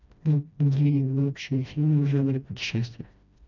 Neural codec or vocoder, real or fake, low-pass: codec, 16 kHz, 1 kbps, FreqCodec, smaller model; fake; 7.2 kHz